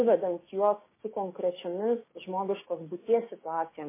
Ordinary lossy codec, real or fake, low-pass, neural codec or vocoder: MP3, 16 kbps; fake; 3.6 kHz; codec, 16 kHz, 6 kbps, DAC